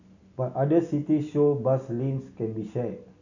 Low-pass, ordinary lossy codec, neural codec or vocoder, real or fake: 7.2 kHz; none; none; real